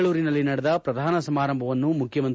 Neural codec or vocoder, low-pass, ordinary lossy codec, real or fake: none; none; none; real